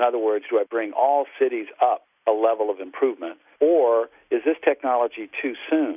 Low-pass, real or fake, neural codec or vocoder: 3.6 kHz; real; none